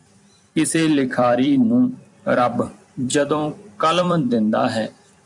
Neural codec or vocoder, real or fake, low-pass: vocoder, 44.1 kHz, 128 mel bands every 256 samples, BigVGAN v2; fake; 10.8 kHz